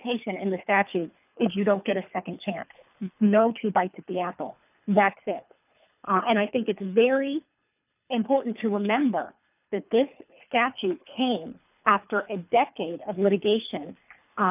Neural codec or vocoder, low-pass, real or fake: codec, 24 kHz, 3 kbps, HILCodec; 3.6 kHz; fake